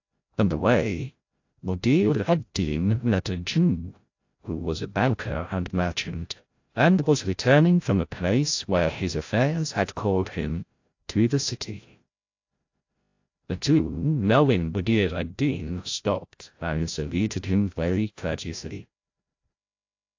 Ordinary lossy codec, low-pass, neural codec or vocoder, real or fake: AAC, 48 kbps; 7.2 kHz; codec, 16 kHz, 0.5 kbps, FreqCodec, larger model; fake